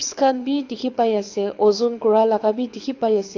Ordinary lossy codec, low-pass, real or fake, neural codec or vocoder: AAC, 48 kbps; 7.2 kHz; fake; codec, 24 kHz, 6 kbps, HILCodec